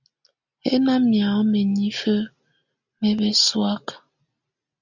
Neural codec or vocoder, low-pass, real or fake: none; 7.2 kHz; real